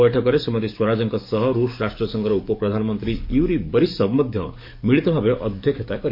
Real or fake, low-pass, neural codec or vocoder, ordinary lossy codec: fake; 5.4 kHz; codec, 44.1 kHz, 7.8 kbps, DAC; MP3, 32 kbps